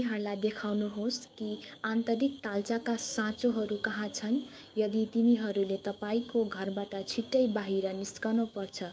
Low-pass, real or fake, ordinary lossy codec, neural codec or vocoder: none; fake; none; codec, 16 kHz, 6 kbps, DAC